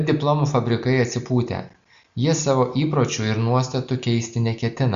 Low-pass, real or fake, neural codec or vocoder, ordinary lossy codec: 7.2 kHz; real; none; Opus, 64 kbps